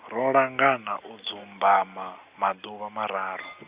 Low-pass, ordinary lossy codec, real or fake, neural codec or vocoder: 3.6 kHz; Opus, 64 kbps; real; none